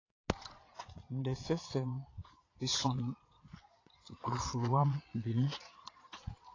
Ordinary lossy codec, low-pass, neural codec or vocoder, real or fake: AAC, 32 kbps; 7.2 kHz; vocoder, 22.05 kHz, 80 mel bands, Vocos; fake